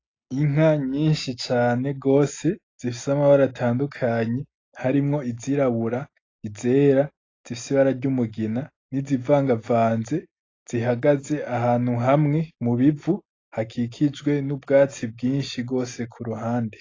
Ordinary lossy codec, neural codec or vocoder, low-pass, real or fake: AAC, 32 kbps; none; 7.2 kHz; real